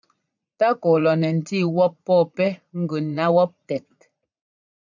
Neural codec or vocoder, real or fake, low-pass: vocoder, 44.1 kHz, 80 mel bands, Vocos; fake; 7.2 kHz